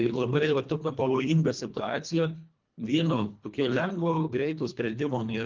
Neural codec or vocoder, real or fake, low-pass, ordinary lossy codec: codec, 24 kHz, 1.5 kbps, HILCodec; fake; 7.2 kHz; Opus, 24 kbps